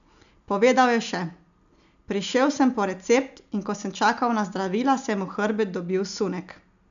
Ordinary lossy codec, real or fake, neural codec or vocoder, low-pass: none; real; none; 7.2 kHz